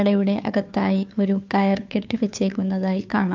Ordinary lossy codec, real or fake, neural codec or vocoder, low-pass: MP3, 64 kbps; fake; codec, 16 kHz, 2 kbps, FunCodec, trained on Chinese and English, 25 frames a second; 7.2 kHz